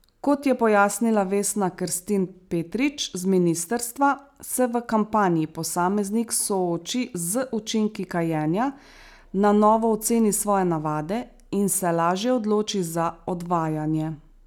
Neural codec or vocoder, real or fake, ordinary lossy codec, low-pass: none; real; none; none